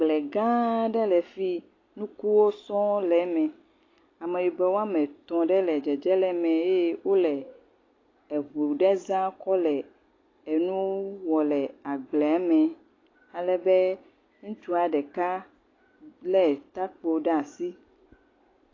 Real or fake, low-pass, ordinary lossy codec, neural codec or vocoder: real; 7.2 kHz; AAC, 32 kbps; none